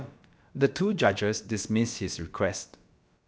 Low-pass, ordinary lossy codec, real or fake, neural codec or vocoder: none; none; fake; codec, 16 kHz, about 1 kbps, DyCAST, with the encoder's durations